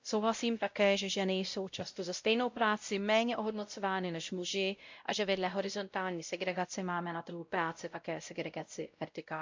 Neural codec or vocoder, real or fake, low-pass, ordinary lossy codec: codec, 16 kHz, 0.5 kbps, X-Codec, WavLM features, trained on Multilingual LibriSpeech; fake; 7.2 kHz; MP3, 64 kbps